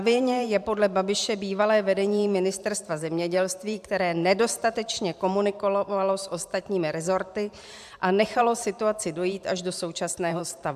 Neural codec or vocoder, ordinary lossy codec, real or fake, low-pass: vocoder, 44.1 kHz, 128 mel bands every 512 samples, BigVGAN v2; AAC, 96 kbps; fake; 14.4 kHz